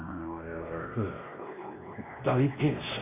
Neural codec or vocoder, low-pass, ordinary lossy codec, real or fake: codec, 16 kHz, 1 kbps, X-Codec, WavLM features, trained on Multilingual LibriSpeech; 3.6 kHz; MP3, 24 kbps; fake